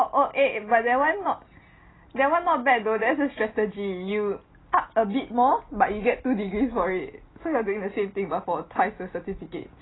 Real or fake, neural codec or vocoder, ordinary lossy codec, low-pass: real; none; AAC, 16 kbps; 7.2 kHz